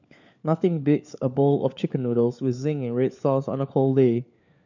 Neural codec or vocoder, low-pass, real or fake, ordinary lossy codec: codec, 16 kHz, 4 kbps, FunCodec, trained on LibriTTS, 50 frames a second; 7.2 kHz; fake; none